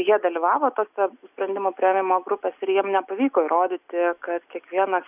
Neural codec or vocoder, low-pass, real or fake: none; 3.6 kHz; real